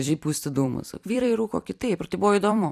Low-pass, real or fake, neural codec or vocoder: 14.4 kHz; fake; vocoder, 44.1 kHz, 128 mel bands every 256 samples, BigVGAN v2